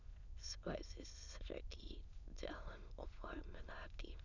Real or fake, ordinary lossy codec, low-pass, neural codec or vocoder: fake; none; 7.2 kHz; autoencoder, 22.05 kHz, a latent of 192 numbers a frame, VITS, trained on many speakers